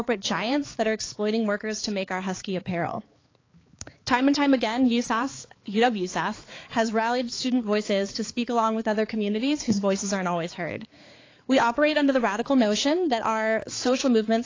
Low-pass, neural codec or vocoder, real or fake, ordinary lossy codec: 7.2 kHz; codec, 16 kHz, 4 kbps, X-Codec, HuBERT features, trained on balanced general audio; fake; AAC, 32 kbps